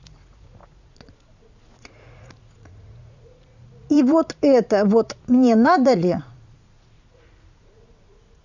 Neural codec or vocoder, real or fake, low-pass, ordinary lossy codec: vocoder, 44.1 kHz, 80 mel bands, Vocos; fake; 7.2 kHz; none